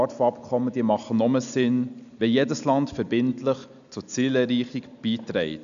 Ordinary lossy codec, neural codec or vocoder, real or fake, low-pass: none; none; real; 7.2 kHz